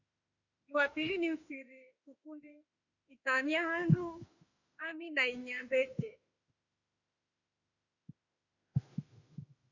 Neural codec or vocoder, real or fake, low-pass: autoencoder, 48 kHz, 32 numbers a frame, DAC-VAE, trained on Japanese speech; fake; 7.2 kHz